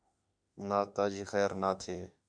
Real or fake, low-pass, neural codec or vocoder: fake; 9.9 kHz; autoencoder, 48 kHz, 32 numbers a frame, DAC-VAE, trained on Japanese speech